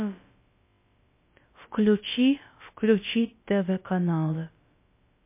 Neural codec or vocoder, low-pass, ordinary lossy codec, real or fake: codec, 16 kHz, about 1 kbps, DyCAST, with the encoder's durations; 3.6 kHz; MP3, 24 kbps; fake